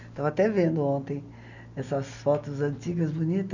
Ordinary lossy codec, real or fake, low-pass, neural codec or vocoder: none; real; 7.2 kHz; none